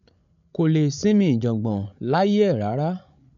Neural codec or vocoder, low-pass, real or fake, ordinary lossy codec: codec, 16 kHz, 16 kbps, FreqCodec, larger model; 7.2 kHz; fake; none